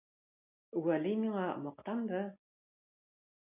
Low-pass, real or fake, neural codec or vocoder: 3.6 kHz; real; none